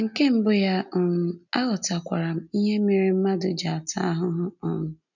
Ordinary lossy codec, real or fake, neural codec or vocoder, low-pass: none; real; none; 7.2 kHz